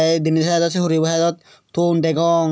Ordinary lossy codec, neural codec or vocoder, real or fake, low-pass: none; none; real; none